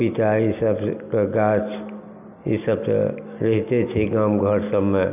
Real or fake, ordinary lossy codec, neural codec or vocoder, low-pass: real; none; none; 3.6 kHz